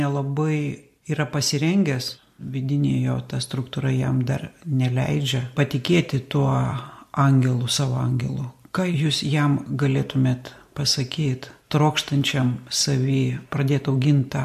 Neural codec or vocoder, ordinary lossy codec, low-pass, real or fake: none; MP3, 64 kbps; 14.4 kHz; real